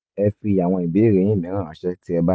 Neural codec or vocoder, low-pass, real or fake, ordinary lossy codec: none; none; real; none